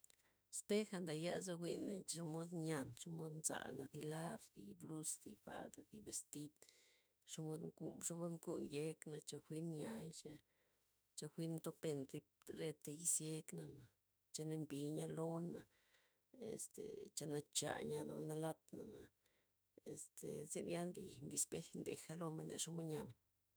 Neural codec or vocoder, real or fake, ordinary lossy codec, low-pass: autoencoder, 48 kHz, 32 numbers a frame, DAC-VAE, trained on Japanese speech; fake; none; none